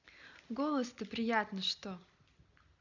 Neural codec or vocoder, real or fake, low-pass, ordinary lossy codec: none; real; 7.2 kHz; none